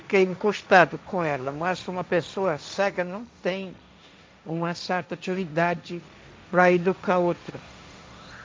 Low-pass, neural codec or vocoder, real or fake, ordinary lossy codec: none; codec, 16 kHz, 1.1 kbps, Voila-Tokenizer; fake; none